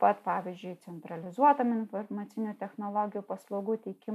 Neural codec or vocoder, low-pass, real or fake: none; 14.4 kHz; real